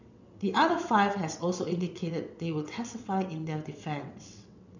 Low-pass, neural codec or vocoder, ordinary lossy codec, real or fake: 7.2 kHz; vocoder, 22.05 kHz, 80 mel bands, WaveNeXt; none; fake